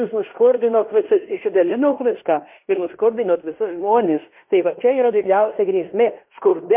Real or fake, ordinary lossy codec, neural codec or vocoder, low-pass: fake; MP3, 32 kbps; codec, 16 kHz in and 24 kHz out, 0.9 kbps, LongCat-Audio-Codec, fine tuned four codebook decoder; 3.6 kHz